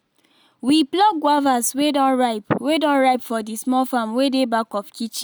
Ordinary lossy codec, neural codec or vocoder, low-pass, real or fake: none; none; none; real